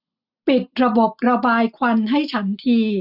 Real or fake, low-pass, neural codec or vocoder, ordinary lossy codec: real; 5.4 kHz; none; none